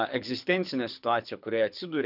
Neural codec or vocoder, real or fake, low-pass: codec, 24 kHz, 6 kbps, HILCodec; fake; 5.4 kHz